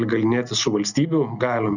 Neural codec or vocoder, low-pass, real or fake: none; 7.2 kHz; real